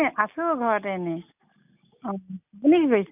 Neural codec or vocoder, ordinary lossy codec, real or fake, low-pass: none; none; real; 3.6 kHz